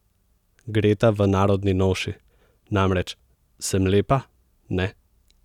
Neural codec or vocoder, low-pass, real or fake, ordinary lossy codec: none; 19.8 kHz; real; none